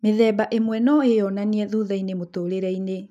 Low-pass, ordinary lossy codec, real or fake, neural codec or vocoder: 14.4 kHz; none; real; none